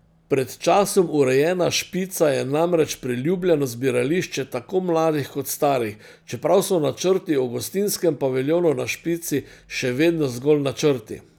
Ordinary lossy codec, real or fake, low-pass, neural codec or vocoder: none; real; none; none